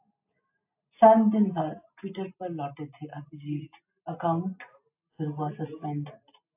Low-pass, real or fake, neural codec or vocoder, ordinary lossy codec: 3.6 kHz; real; none; AAC, 32 kbps